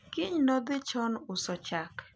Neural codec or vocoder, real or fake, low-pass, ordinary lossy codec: none; real; none; none